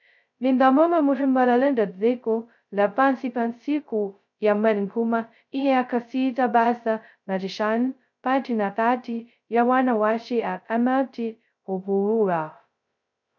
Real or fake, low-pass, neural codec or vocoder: fake; 7.2 kHz; codec, 16 kHz, 0.2 kbps, FocalCodec